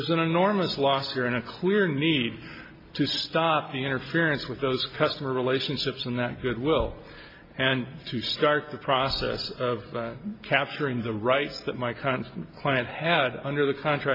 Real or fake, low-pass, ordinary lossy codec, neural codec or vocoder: real; 5.4 kHz; MP3, 32 kbps; none